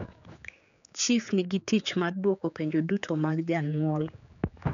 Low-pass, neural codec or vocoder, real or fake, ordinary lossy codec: 7.2 kHz; codec, 16 kHz, 4 kbps, X-Codec, HuBERT features, trained on general audio; fake; none